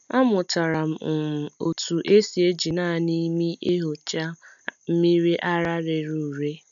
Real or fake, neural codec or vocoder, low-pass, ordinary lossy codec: real; none; 7.2 kHz; none